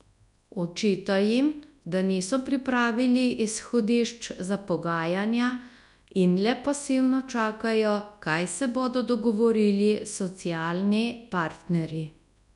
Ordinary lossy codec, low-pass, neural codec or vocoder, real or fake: none; 10.8 kHz; codec, 24 kHz, 0.9 kbps, WavTokenizer, large speech release; fake